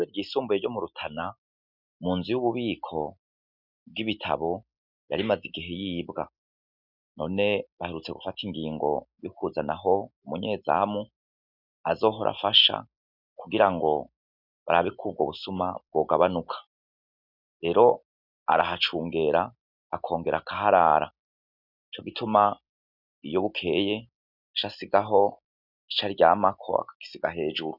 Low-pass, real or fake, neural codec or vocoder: 5.4 kHz; real; none